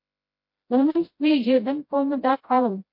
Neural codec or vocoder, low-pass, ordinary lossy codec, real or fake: codec, 16 kHz, 0.5 kbps, FreqCodec, smaller model; 5.4 kHz; MP3, 32 kbps; fake